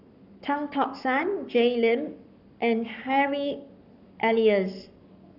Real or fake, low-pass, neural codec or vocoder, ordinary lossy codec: fake; 5.4 kHz; codec, 44.1 kHz, 7.8 kbps, Pupu-Codec; none